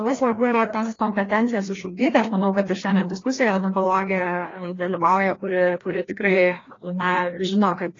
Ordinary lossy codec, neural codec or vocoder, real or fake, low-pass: AAC, 32 kbps; codec, 16 kHz, 1 kbps, FreqCodec, larger model; fake; 7.2 kHz